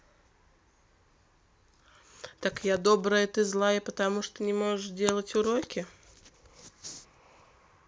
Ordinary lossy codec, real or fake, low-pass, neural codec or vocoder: none; real; none; none